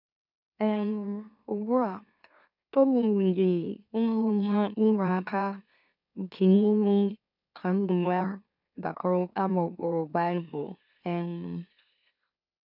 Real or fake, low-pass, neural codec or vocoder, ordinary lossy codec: fake; 5.4 kHz; autoencoder, 44.1 kHz, a latent of 192 numbers a frame, MeloTTS; none